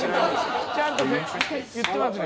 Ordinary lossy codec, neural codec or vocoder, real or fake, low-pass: none; none; real; none